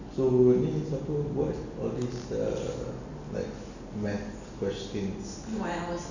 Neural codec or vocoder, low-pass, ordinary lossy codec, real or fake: none; 7.2 kHz; none; real